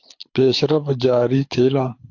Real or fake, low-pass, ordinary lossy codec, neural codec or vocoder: fake; 7.2 kHz; AAC, 48 kbps; codec, 24 kHz, 6 kbps, HILCodec